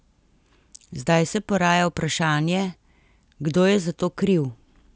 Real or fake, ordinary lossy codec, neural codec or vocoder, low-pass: real; none; none; none